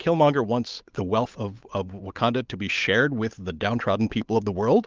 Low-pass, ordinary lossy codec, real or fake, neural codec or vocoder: 7.2 kHz; Opus, 24 kbps; fake; codec, 16 kHz, 8 kbps, FunCodec, trained on Chinese and English, 25 frames a second